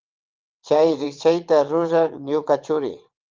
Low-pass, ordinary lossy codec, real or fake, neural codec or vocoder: 7.2 kHz; Opus, 24 kbps; fake; vocoder, 22.05 kHz, 80 mel bands, WaveNeXt